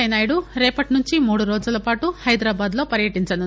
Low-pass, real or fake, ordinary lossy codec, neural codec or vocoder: none; real; none; none